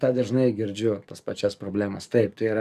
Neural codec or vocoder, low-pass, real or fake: codec, 44.1 kHz, 7.8 kbps, DAC; 14.4 kHz; fake